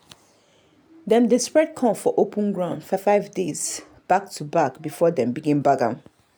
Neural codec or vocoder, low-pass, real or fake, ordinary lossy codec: none; none; real; none